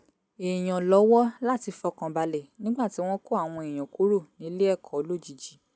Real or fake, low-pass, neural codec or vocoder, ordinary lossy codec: real; none; none; none